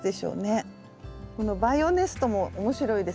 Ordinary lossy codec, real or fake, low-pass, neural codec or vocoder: none; real; none; none